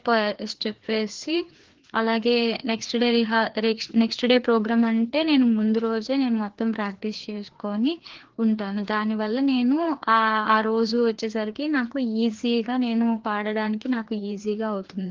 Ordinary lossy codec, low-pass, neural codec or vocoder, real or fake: Opus, 16 kbps; 7.2 kHz; codec, 16 kHz, 2 kbps, FreqCodec, larger model; fake